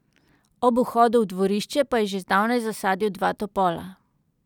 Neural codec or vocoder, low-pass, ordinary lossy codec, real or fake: none; 19.8 kHz; none; real